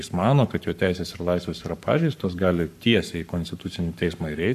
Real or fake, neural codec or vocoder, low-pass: fake; codec, 44.1 kHz, 7.8 kbps, Pupu-Codec; 14.4 kHz